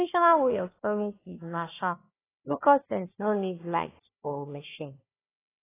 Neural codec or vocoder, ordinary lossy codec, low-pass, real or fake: codec, 16 kHz, 1 kbps, FunCodec, trained on Chinese and English, 50 frames a second; AAC, 16 kbps; 3.6 kHz; fake